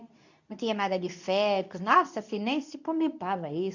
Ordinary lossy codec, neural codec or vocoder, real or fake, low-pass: none; codec, 24 kHz, 0.9 kbps, WavTokenizer, medium speech release version 2; fake; 7.2 kHz